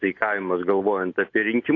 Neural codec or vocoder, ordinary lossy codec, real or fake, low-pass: none; AAC, 48 kbps; real; 7.2 kHz